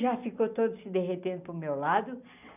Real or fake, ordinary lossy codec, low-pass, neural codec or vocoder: real; none; 3.6 kHz; none